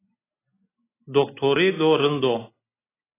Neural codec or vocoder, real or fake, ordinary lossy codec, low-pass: none; real; AAC, 16 kbps; 3.6 kHz